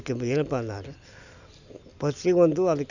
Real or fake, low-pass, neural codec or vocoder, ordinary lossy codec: real; 7.2 kHz; none; none